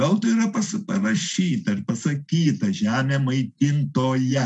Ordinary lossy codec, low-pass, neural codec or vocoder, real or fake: MP3, 64 kbps; 10.8 kHz; none; real